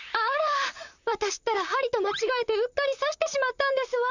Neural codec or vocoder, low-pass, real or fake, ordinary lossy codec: vocoder, 44.1 kHz, 128 mel bands, Pupu-Vocoder; 7.2 kHz; fake; none